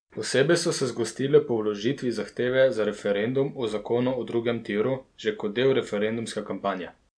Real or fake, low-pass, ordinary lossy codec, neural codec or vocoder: real; 9.9 kHz; none; none